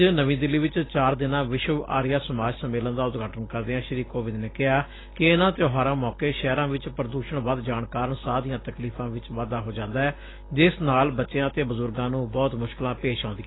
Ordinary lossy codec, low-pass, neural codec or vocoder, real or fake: AAC, 16 kbps; 7.2 kHz; none; real